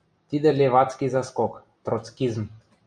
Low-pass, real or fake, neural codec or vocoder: 9.9 kHz; real; none